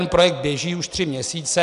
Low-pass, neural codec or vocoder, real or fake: 10.8 kHz; none; real